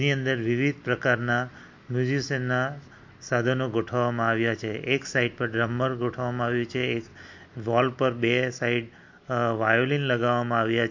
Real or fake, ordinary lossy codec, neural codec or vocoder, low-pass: real; MP3, 48 kbps; none; 7.2 kHz